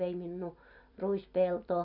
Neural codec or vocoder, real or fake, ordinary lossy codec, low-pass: vocoder, 44.1 kHz, 128 mel bands every 256 samples, BigVGAN v2; fake; none; 5.4 kHz